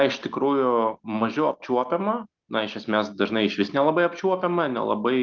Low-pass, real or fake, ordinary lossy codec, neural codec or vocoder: 7.2 kHz; real; Opus, 32 kbps; none